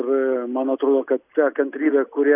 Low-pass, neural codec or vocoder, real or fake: 3.6 kHz; none; real